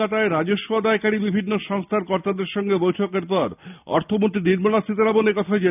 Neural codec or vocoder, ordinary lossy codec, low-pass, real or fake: none; none; 3.6 kHz; real